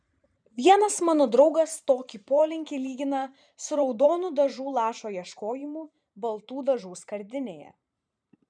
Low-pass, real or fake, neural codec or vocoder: 9.9 kHz; fake; vocoder, 44.1 kHz, 128 mel bands every 512 samples, BigVGAN v2